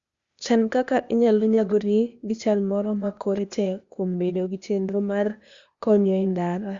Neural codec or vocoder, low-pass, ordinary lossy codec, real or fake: codec, 16 kHz, 0.8 kbps, ZipCodec; 7.2 kHz; Opus, 64 kbps; fake